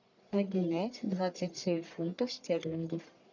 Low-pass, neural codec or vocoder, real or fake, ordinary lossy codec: 7.2 kHz; codec, 44.1 kHz, 1.7 kbps, Pupu-Codec; fake; MP3, 64 kbps